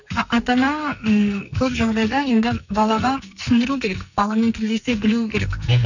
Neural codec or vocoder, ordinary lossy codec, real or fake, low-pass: codec, 44.1 kHz, 2.6 kbps, SNAC; none; fake; 7.2 kHz